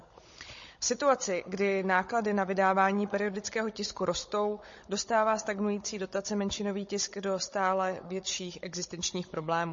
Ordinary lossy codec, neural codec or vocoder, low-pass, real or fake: MP3, 32 kbps; codec, 16 kHz, 16 kbps, FunCodec, trained on Chinese and English, 50 frames a second; 7.2 kHz; fake